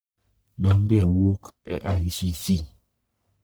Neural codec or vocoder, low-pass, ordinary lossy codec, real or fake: codec, 44.1 kHz, 1.7 kbps, Pupu-Codec; none; none; fake